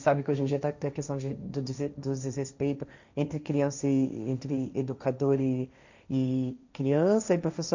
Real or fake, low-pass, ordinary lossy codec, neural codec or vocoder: fake; 7.2 kHz; none; codec, 16 kHz, 1.1 kbps, Voila-Tokenizer